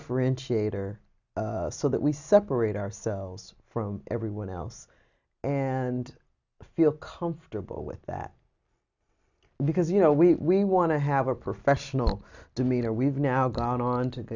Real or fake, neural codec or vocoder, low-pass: real; none; 7.2 kHz